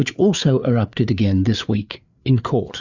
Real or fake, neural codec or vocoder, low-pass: fake; codec, 16 kHz, 4 kbps, FreqCodec, larger model; 7.2 kHz